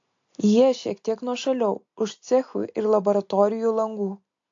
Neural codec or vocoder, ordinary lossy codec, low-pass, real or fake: none; AAC, 48 kbps; 7.2 kHz; real